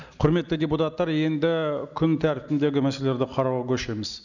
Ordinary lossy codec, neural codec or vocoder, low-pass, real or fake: none; none; 7.2 kHz; real